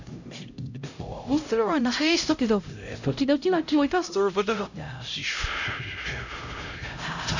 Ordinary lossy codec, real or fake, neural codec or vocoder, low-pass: none; fake; codec, 16 kHz, 0.5 kbps, X-Codec, HuBERT features, trained on LibriSpeech; 7.2 kHz